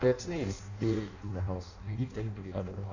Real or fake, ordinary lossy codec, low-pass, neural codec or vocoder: fake; none; 7.2 kHz; codec, 16 kHz in and 24 kHz out, 0.6 kbps, FireRedTTS-2 codec